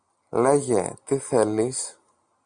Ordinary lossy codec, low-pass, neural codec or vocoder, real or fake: Opus, 64 kbps; 9.9 kHz; none; real